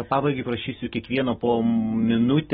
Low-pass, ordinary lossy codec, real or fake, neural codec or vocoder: 7.2 kHz; AAC, 16 kbps; real; none